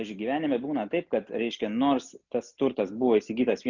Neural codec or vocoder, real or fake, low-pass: none; real; 7.2 kHz